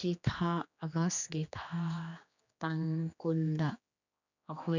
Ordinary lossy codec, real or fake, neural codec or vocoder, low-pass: none; fake; codec, 16 kHz, 2 kbps, X-Codec, HuBERT features, trained on general audio; 7.2 kHz